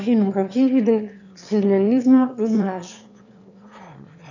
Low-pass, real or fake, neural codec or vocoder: 7.2 kHz; fake; autoencoder, 22.05 kHz, a latent of 192 numbers a frame, VITS, trained on one speaker